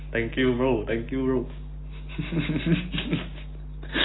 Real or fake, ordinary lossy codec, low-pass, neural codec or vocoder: real; AAC, 16 kbps; 7.2 kHz; none